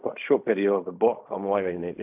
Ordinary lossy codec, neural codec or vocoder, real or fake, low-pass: AAC, 32 kbps; codec, 16 kHz in and 24 kHz out, 0.4 kbps, LongCat-Audio-Codec, fine tuned four codebook decoder; fake; 3.6 kHz